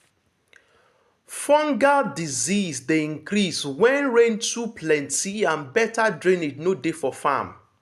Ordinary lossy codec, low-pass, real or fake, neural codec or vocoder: AAC, 96 kbps; 14.4 kHz; real; none